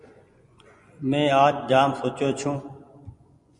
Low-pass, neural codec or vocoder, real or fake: 10.8 kHz; vocoder, 24 kHz, 100 mel bands, Vocos; fake